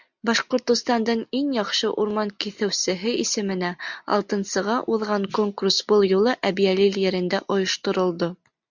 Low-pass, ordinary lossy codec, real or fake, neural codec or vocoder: 7.2 kHz; MP3, 64 kbps; real; none